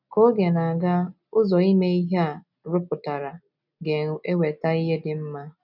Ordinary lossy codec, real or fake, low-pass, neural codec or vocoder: none; real; 5.4 kHz; none